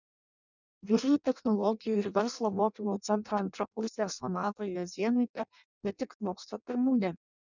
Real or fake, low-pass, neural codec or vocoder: fake; 7.2 kHz; codec, 16 kHz in and 24 kHz out, 0.6 kbps, FireRedTTS-2 codec